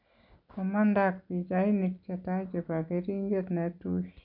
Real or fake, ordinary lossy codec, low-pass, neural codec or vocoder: real; none; 5.4 kHz; none